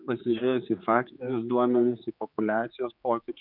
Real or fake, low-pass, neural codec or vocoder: fake; 5.4 kHz; codec, 16 kHz, 4 kbps, X-Codec, HuBERT features, trained on general audio